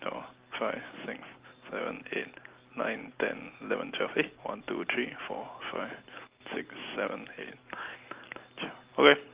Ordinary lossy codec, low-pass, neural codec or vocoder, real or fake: Opus, 32 kbps; 3.6 kHz; none; real